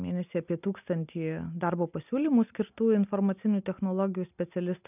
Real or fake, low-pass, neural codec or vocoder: real; 3.6 kHz; none